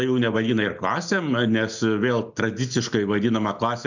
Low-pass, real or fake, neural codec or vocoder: 7.2 kHz; real; none